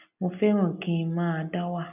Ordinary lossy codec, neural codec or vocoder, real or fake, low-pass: none; none; real; 3.6 kHz